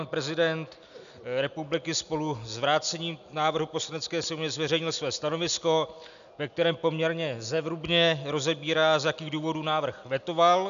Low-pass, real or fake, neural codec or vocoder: 7.2 kHz; real; none